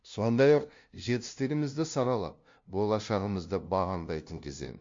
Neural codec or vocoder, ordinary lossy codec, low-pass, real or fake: codec, 16 kHz, 0.5 kbps, FunCodec, trained on LibriTTS, 25 frames a second; MP3, 48 kbps; 7.2 kHz; fake